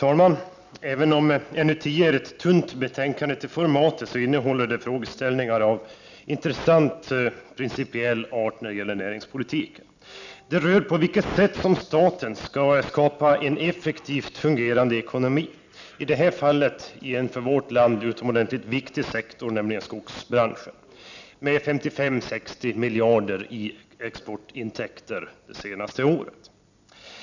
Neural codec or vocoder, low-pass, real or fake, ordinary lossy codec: none; 7.2 kHz; real; none